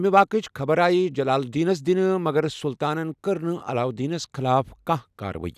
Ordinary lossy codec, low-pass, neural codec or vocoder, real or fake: none; 14.4 kHz; none; real